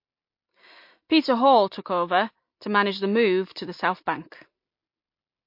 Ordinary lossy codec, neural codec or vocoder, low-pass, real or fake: MP3, 32 kbps; none; 5.4 kHz; real